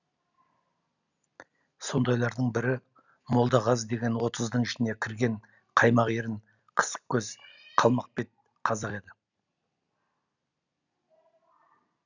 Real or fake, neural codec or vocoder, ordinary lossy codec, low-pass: real; none; none; 7.2 kHz